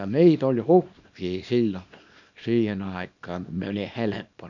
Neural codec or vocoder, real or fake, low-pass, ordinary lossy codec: codec, 24 kHz, 0.9 kbps, WavTokenizer, small release; fake; 7.2 kHz; none